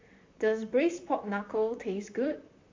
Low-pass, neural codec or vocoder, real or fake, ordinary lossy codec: 7.2 kHz; vocoder, 44.1 kHz, 128 mel bands, Pupu-Vocoder; fake; MP3, 48 kbps